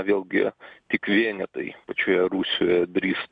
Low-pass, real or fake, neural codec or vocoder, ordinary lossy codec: 9.9 kHz; real; none; AAC, 64 kbps